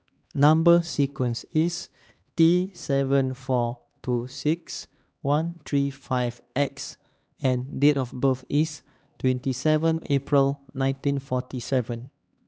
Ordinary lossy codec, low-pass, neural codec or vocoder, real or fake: none; none; codec, 16 kHz, 2 kbps, X-Codec, HuBERT features, trained on LibriSpeech; fake